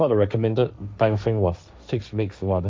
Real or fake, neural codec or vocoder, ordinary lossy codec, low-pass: fake; codec, 16 kHz, 1.1 kbps, Voila-Tokenizer; none; none